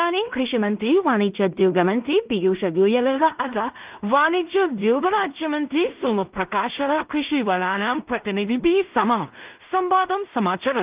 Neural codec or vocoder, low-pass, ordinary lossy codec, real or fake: codec, 16 kHz in and 24 kHz out, 0.4 kbps, LongCat-Audio-Codec, two codebook decoder; 3.6 kHz; Opus, 32 kbps; fake